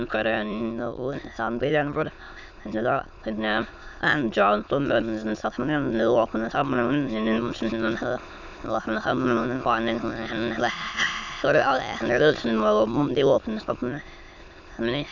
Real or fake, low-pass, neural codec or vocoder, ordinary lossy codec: fake; 7.2 kHz; autoencoder, 22.05 kHz, a latent of 192 numbers a frame, VITS, trained on many speakers; none